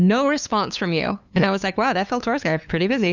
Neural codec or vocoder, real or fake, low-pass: codec, 16 kHz, 2 kbps, FunCodec, trained on LibriTTS, 25 frames a second; fake; 7.2 kHz